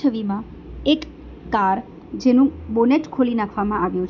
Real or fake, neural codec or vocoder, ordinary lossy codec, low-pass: real; none; none; 7.2 kHz